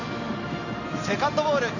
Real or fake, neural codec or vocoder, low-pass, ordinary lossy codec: real; none; 7.2 kHz; none